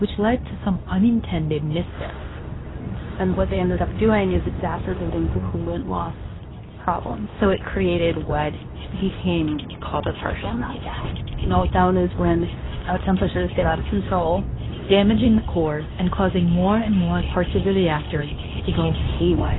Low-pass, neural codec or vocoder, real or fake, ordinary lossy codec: 7.2 kHz; codec, 24 kHz, 0.9 kbps, WavTokenizer, medium speech release version 2; fake; AAC, 16 kbps